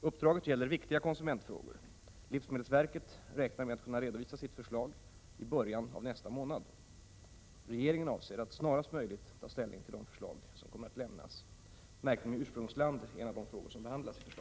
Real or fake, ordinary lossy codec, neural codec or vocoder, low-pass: real; none; none; none